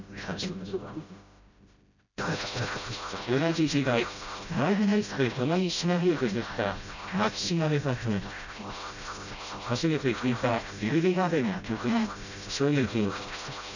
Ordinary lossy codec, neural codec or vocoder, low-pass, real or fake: none; codec, 16 kHz, 0.5 kbps, FreqCodec, smaller model; 7.2 kHz; fake